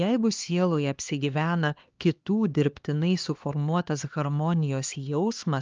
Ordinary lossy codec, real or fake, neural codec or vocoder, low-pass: Opus, 24 kbps; fake; codec, 16 kHz, 2 kbps, X-Codec, HuBERT features, trained on LibriSpeech; 7.2 kHz